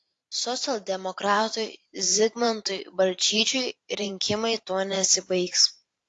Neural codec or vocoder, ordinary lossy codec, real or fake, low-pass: vocoder, 44.1 kHz, 128 mel bands every 512 samples, BigVGAN v2; AAC, 48 kbps; fake; 10.8 kHz